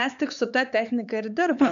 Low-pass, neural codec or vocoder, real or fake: 7.2 kHz; codec, 16 kHz, 4 kbps, X-Codec, HuBERT features, trained on LibriSpeech; fake